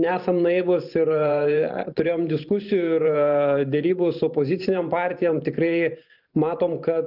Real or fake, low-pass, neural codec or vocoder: fake; 5.4 kHz; vocoder, 44.1 kHz, 128 mel bands every 512 samples, BigVGAN v2